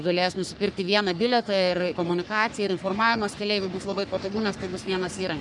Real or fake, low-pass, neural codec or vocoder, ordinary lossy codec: fake; 10.8 kHz; codec, 44.1 kHz, 3.4 kbps, Pupu-Codec; MP3, 96 kbps